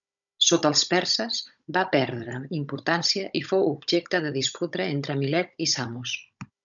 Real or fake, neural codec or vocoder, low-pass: fake; codec, 16 kHz, 16 kbps, FunCodec, trained on Chinese and English, 50 frames a second; 7.2 kHz